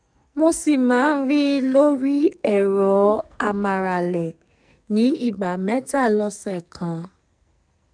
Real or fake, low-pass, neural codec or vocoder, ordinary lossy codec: fake; 9.9 kHz; codec, 44.1 kHz, 2.6 kbps, SNAC; none